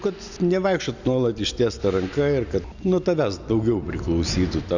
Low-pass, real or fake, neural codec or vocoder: 7.2 kHz; real; none